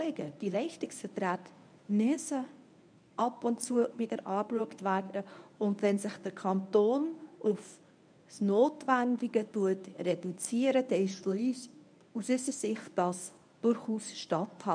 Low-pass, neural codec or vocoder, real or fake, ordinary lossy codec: 9.9 kHz; codec, 24 kHz, 0.9 kbps, WavTokenizer, medium speech release version 1; fake; none